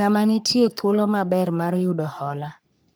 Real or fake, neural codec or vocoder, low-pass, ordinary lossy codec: fake; codec, 44.1 kHz, 3.4 kbps, Pupu-Codec; none; none